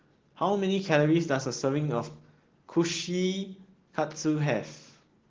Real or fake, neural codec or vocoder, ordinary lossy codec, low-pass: real; none; Opus, 16 kbps; 7.2 kHz